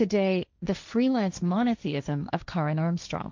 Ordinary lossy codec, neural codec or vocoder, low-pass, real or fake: MP3, 64 kbps; codec, 16 kHz, 1.1 kbps, Voila-Tokenizer; 7.2 kHz; fake